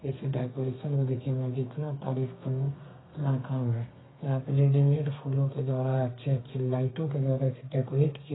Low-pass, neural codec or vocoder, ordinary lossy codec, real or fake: 7.2 kHz; codec, 32 kHz, 1.9 kbps, SNAC; AAC, 16 kbps; fake